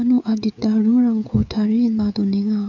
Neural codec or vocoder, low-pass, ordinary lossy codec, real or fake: codec, 16 kHz, 16 kbps, FreqCodec, smaller model; 7.2 kHz; none; fake